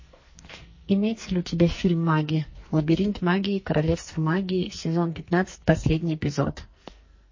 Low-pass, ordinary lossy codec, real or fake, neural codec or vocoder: 7.2 kHz; MP3, 32 kbps; fake; codec, 44.1 kHz, 2.6 kbps, SNAC